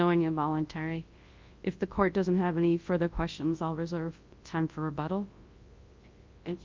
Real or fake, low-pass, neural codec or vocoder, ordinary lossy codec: fake; 7.2 kHz; codec, 24 kHz, 0.9 kbps, WavTokenizer, large speech release; Opus, 24 kbps